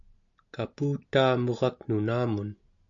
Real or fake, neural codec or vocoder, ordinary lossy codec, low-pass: real; none; AAC, 64 kbps; 7.2 kHz